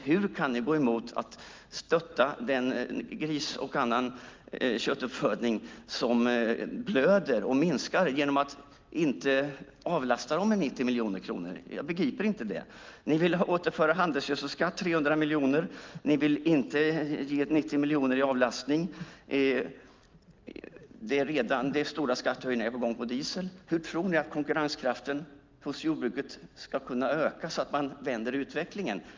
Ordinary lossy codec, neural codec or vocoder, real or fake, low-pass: Opus, 32 kbps; none; real; 7.2 kHz